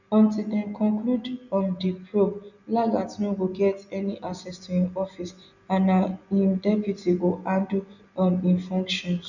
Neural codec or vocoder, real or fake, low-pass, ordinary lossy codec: none; real; 7.2 kHz; none